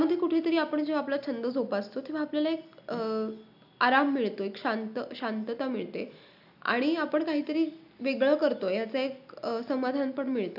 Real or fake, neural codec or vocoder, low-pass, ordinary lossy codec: real; none; 5.4 kHz; none